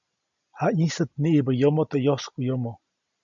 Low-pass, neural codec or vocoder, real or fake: 7.2 kHz; none; real